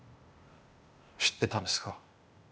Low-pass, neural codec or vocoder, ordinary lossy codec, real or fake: none; codec, 16 kHz, 0.8 kbps, ZipCodec; none; fake